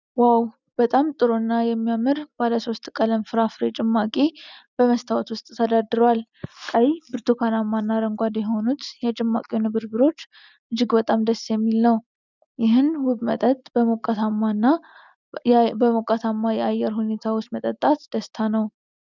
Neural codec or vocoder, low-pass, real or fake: none; 7.2 kHz; real